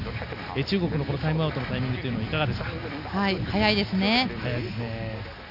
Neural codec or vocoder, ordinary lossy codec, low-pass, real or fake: none; none; 5.4 kHz; real